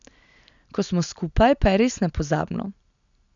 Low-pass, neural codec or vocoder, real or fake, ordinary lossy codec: 7.2 kHz; none; real; none